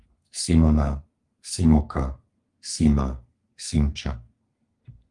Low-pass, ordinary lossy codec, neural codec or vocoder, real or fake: 10.8 kHz; Opus, 24 kbps; codec, 32 kHz, 1.9 kbps, SNAC; fake